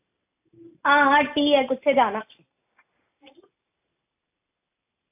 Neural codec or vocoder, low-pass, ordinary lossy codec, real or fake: none; 3.6 kHz; AAC, 32 kbps; real